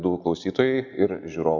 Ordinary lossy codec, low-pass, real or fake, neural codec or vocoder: MP3, 64 kbps; 7.2 kHz; real; none